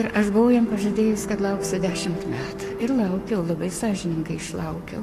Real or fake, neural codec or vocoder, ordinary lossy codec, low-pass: fake; codec, 44.1 kHz, 7.8 kbps, Pupu-Codec; AAC, 64 kbps; 14.4 kHz